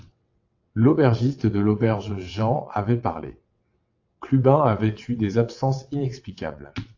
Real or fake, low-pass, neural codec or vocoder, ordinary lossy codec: fake; 7.2 kHz; vocoder, 22.05 kHz, 80 mel bands, WaveNeXt; AAC, 48 kbps